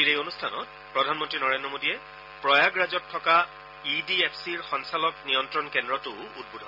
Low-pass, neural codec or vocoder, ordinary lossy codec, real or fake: 5.4 kHz; none; none; real